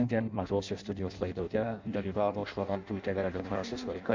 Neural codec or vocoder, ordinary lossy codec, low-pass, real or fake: codec, 16 kHz in and 24 kHz out, 0.6 kbps, FireRedTTS-2 codec; MP3, 64 kbps; 7.2 kHz; fake